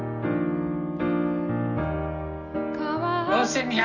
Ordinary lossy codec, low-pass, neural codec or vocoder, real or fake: none; 7.2 kHz; none; real